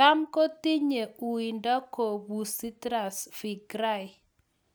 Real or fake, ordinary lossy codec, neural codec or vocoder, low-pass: real; none; none; none